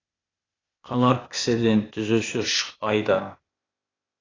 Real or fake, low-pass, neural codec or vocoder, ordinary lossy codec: fake; 7.2 kHz; codec, 16 kHz, 0.8 kbps, ZipCodec; AAC, 32 kbps